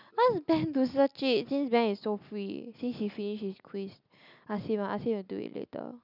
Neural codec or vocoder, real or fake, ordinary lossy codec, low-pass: none; real; none; 5.4 kHz